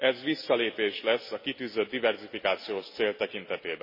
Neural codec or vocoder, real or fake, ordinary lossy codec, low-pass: none; real; MP3, 24 kbps; 5.4 kHz